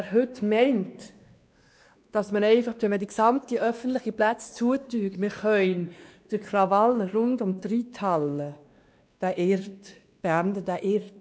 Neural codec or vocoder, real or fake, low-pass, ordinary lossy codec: codec, 16 kHz, 2 kbps, X-Codec, WavLM features, trained on Multilingual LibriSpeech; fake; none; none